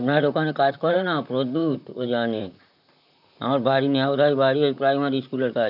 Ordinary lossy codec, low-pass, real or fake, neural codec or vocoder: none; 5.4 kHz; fake; vocoder, 44.1 kHz, 128 mel bands, Pupu-Vocoder